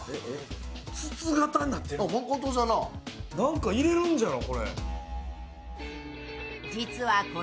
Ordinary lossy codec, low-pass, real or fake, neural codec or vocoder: none; none; real; none